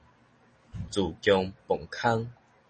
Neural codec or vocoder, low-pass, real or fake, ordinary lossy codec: none; 9.9 kHz; real; MP3, 32 kbps